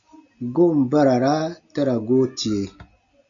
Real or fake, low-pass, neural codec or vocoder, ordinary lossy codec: real; 7.2 kHz; none; AAC, 64 kbps